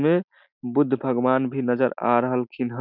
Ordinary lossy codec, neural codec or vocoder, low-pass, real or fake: none; none; 5.4 kHz; real